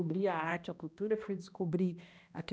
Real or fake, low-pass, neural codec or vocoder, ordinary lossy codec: fake; none; codec, 16 kHz, 1 kbps, X-Codec, HuBERT features, trained on balanced general audio; none